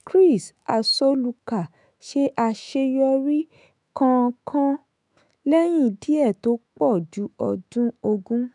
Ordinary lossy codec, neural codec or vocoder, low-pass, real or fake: none; autoencoder, 48 kHz, 128 numbers a frame, DAC-VAE, trained on Japanese speech; 10.8 kHz; fake